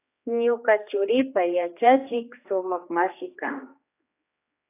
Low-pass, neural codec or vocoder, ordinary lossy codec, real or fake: 3.6 kHz; codec, 16 kHz, 2 kbps, X-Codec, HuBERT features, trained on general audio; AAC, 24 kbps; fake